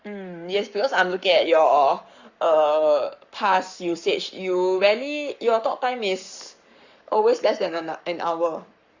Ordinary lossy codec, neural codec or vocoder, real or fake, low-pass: Opus, 64 kbps; codec, 44.1 kHz, 7.8 kbps, Pupu-Codec; fake; 7.2 kHz